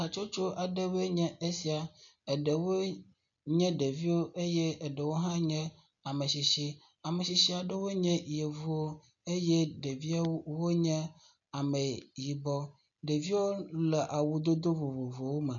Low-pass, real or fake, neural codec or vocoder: 7.2 kHz; real; none